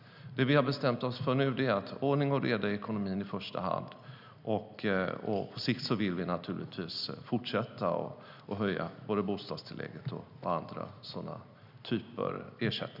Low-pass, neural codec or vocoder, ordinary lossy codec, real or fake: 5.4 kHz; none; none; real